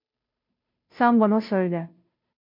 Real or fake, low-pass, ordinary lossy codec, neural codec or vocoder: fake; 5.4 kHz; MP3, 48 kbps; codec, 16 kHz, 0.5 kbps, FunCodec, trained on Chinese and English, 25 frames a second